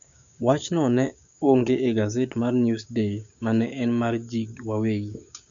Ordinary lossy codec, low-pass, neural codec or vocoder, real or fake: none; 7.2 kHz; codec, 16 kHz, 6 kbps, DAC; fake